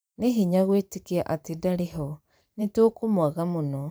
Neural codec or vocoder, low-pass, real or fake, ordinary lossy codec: vocoder, 44.1 kHz, 128 mel bands every 512 samples, BigVGAN v2; none; fake; none